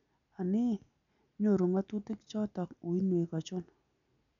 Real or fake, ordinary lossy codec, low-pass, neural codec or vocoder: real; none; 7.2 kHz; none